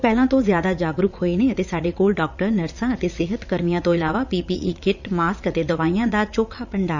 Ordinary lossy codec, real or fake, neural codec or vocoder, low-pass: none; fake; vocoder, 44.1 kHz, 80 mel bands, Vocos; 7.2 kHz